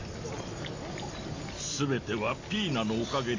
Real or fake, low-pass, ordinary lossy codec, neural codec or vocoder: real; 7.2 kHz; AAC, 48 kbps; none